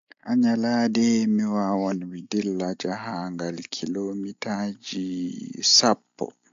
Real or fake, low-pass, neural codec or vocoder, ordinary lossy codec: real; 7.2 kHz; none; AAC, 64 kbps